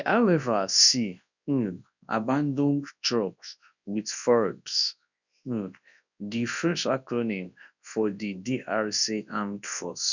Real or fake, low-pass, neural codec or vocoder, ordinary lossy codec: fake; 7.2 kHz; codec, 24 kHz, 0.9 kbps, WavTokenizer, large speech release; none